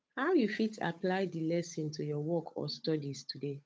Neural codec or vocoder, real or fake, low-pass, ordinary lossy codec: codec, 16 kHz, 8 kbps, FunCodec, trained on Chinese and English, 25 frames a second; fake; none; none